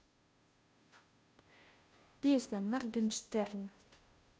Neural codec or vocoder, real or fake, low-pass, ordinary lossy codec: codec, 16 kHz, 0.5 kbps, FunCodec, trained on Chinese and English, 25 frames a second; fake; none; none